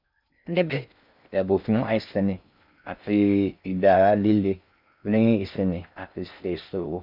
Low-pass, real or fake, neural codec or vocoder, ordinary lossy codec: 5.4 kHz; fake; codec, 16 kHz in and 24 kHz out, 0.6 kbps, FocalCodec, streaming, 2048 codes; none